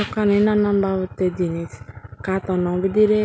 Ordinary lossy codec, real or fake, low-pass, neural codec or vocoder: none; real; none; none